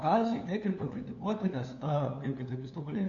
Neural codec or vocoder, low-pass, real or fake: codec, 16 kHz, 2 kbps, FunCodec, trained on LibriTTS, 25 frames a second; 7.2 kHz; fake